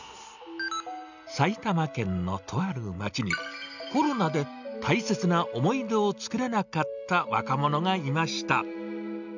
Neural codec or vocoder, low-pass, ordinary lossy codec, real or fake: none; 7.2 kHz; none; real